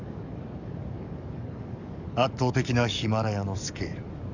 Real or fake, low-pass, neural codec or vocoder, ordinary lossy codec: fake; 7.2 kHz; codec, 44.1 kHz, 7.8 kbps, DAC; none